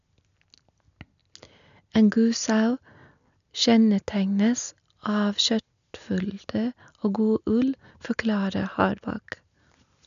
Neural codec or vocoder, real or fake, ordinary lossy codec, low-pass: none; real; none; 7.2 kHz